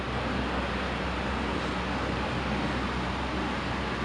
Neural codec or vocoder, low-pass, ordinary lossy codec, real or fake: none; 9.9 kHz; none; real